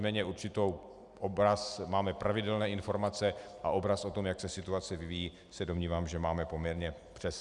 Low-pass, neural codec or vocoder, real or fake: 10.8 kHz; none; real